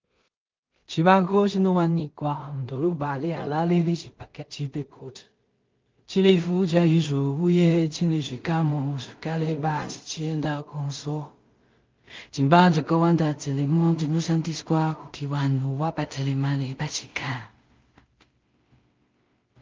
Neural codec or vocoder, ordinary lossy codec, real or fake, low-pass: codec, 16 kHz in and 24 kHz out, 0.4 kbps, LongCat-Audio-Codec, two codebook decoder; Opus, 24 kbps; fake; 7.2 kHz